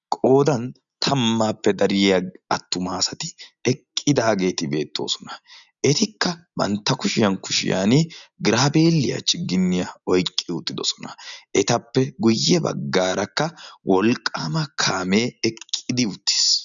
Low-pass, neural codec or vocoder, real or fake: 7.2 kHz; none; real